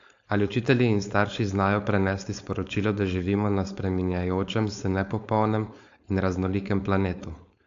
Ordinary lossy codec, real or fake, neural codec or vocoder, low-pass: AAC, 64 kbps; fake; codec, 16 kHz, 4.8 kbps, FACodec; 7.2 kHz